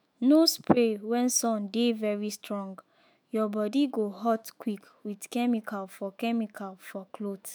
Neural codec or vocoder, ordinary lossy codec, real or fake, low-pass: autoencoder, 48 kHz, 128 numbers a frame, DAC-VAE, trained on Japanese speech; none; fake; none